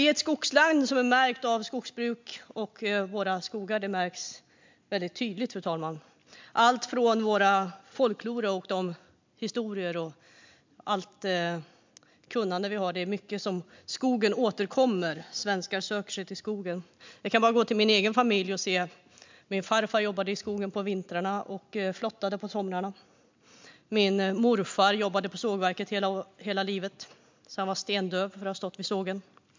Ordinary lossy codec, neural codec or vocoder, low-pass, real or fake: MP3, 64 kbps; none; 7.2 kHz; real